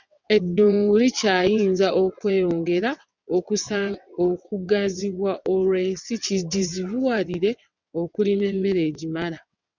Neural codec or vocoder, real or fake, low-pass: vocoder, 22.05 kHz, 80 mel bands, WaveNeXt; fake; 7.2 kHz